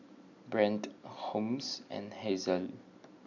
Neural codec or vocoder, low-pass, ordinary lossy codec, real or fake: none; 7.2 kHz; none; real